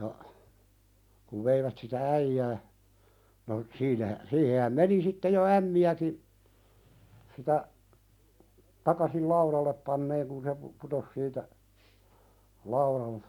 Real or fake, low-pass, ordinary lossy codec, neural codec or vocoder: real; 19.8 kHz; none; none